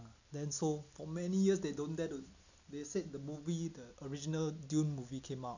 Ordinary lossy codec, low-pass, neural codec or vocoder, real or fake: none; 7.2 kHz; none; real